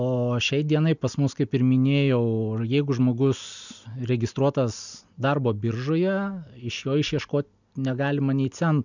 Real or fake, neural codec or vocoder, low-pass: real; none; 7.2 kHz